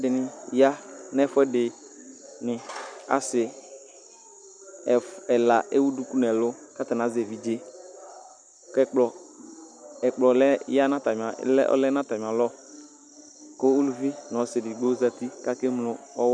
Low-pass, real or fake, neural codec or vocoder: 9.9 kHz; real; none